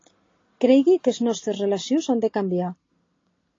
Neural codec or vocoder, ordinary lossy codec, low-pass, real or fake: none; AAC, 32 kbps; 7.2 kHz; real